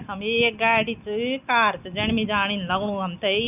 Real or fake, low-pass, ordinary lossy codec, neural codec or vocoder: real; 3.6 kHz; none; none